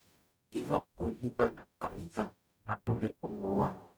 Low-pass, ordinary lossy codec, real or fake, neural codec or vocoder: none; none; fake; codec, 44.1 kHz, 0.9 kbps, DAC